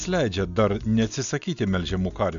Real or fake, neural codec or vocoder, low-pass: real; none; 7.2 kHz